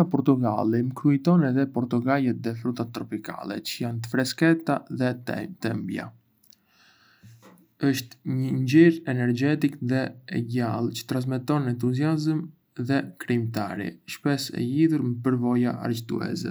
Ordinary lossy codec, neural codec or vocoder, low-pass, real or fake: none; none; none; real